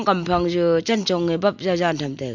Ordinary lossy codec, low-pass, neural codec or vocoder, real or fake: none; 7.2 kHz; none; real